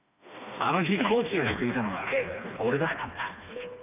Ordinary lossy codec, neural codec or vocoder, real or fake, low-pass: none; codec, 16 kHz, 2 kbps, FreqCodec, smaller model; fake; 3.6 kHz